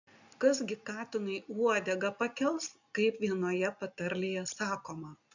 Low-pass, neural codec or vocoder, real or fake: 7.2 kHz; none; real